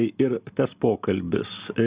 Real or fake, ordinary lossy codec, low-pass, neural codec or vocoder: real; Opus, 64 kbps; 3.6 kHz; none